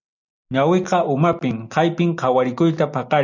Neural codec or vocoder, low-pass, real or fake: none; 7.2 kHz; real